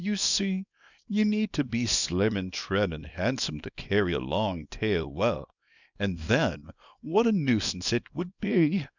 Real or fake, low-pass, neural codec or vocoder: fake; 7.2 kHz; codec, 24 kHz, 0.9 kbps, WavTokenizer, small release